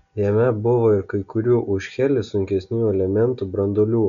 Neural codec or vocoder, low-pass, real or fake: none; 7.2 kHz; real